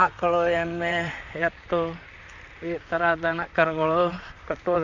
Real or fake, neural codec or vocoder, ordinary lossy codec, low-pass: fake; vocoder, 44.1 kHz, 128 mel bands, Pupu-Vocoder; none; 7.2 kHz